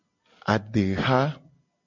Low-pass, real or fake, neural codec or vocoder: 7.2 kHz; real; none